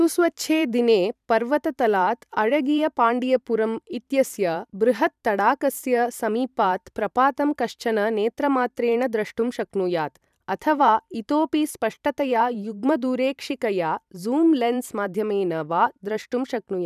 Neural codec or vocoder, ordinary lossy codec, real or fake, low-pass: vocoder, 44.1 kHz, 128 mel bands every 512 samples, BigVGAN v2; none; fake; 14.4 kHz